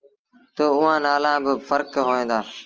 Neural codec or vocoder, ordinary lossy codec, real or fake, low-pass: none; Opus, 24 kbps; real; 7.2 kHz